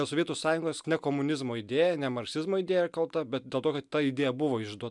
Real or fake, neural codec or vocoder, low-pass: real; none; 10.8 kHz